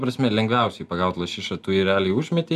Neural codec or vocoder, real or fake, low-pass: none; real; 14.4 kHz